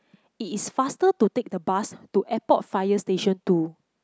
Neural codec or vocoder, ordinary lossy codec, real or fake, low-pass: none; none; real; none